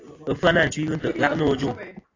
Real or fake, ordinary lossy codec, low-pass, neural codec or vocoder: real; AAC, 32 kbps; 7.2 kHz; none